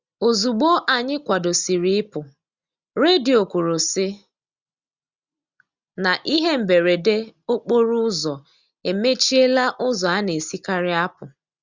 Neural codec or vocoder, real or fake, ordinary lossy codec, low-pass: none; real; Opus, 64 kbps; 7.2 kHz